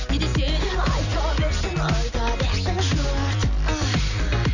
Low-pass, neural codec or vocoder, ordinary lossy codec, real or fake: 7.2 kHz; none; none; real